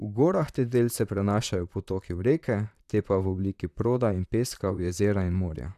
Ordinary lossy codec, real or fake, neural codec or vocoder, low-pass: none; fake; vocoder, 44.1 kHz, 128 mel bands, Pupu-Vocoder; 14.4 kHz